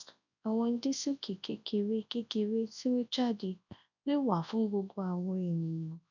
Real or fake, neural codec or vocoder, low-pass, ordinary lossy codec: fake; codec, 24 kHz, 0.9 kbps, WavTokenizer, large speech release; 7.2 kHz; none